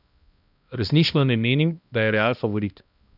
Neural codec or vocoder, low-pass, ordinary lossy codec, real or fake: codec, 16 kHz, 1 kbps, X-Codec, HuBERT features, trained on balanced general audio; 5.4 kHz; none; fake